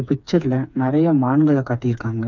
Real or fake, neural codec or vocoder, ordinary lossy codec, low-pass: fake; codec, 16 kHz, 4 kbps, FreqCodec, smaller model; none; 7.2 kHz